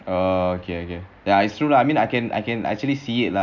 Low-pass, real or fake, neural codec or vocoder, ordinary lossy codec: 7.2 kHz; real; none; none